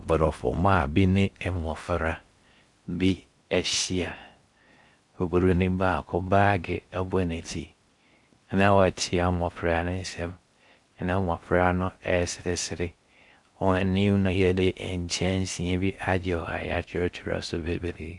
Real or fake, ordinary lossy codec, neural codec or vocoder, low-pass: fake; Opus, 64 kbps; codec, 16 kHz in and 24 kHz out, 0.6 kbps, FocalCodec, streaming, 4096 codes; 10.8 kHz